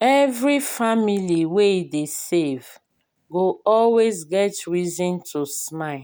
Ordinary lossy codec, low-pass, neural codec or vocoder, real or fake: none; none; none; real